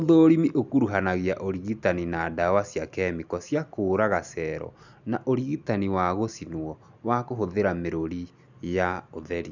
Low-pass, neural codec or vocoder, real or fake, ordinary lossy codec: 7.2 kHz; none; real; none